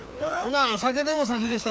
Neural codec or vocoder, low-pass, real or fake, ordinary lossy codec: codec, 16 kHz, 2 kbps, FreqCodec, larger model; none; fake; none